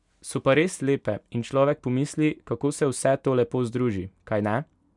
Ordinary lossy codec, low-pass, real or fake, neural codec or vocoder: none; 10.8 kHz; real; none